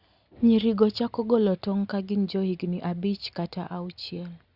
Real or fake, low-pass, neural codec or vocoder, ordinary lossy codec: real; 5.4 kHz; none; Opus, 64 kbps